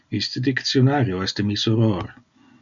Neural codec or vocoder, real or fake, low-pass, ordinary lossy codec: none; real; 7.2 kHz; MP3, 96 kbps